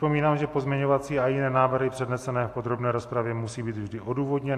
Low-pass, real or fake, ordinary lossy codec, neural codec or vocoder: 14.4 kHz; real; AAC, 64 kbps; none